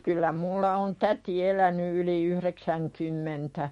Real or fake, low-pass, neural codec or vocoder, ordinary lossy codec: fake; 19.8 kHz; autoencoder, 48 kHz, 128 numbers a frame, DAC-VAE, trained on Japanese speech; MP3, 48 kbps